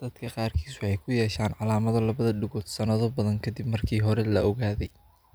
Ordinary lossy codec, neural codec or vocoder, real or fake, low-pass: none; none; real; none